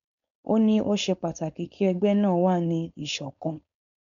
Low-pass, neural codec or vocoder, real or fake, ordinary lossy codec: 7.2 kHz; codec, 16 kHz, 4.8 kbps, FACodec; fake; none